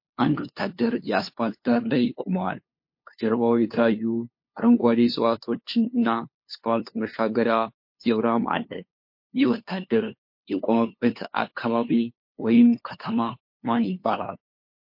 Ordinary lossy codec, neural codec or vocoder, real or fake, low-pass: MP3, 32 kbps; codec, 16 kHz, 2 kbps, FunCodec, trained on LibriTTS, 25 frames a second; fake; 5.4 kHz